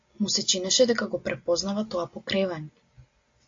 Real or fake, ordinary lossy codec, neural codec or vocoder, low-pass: real; AAC, 48 kbps; none; 7.2 kHz